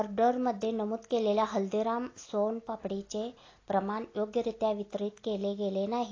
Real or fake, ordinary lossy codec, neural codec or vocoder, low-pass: real; AAC, 32 kbps; none; 7.2 kHz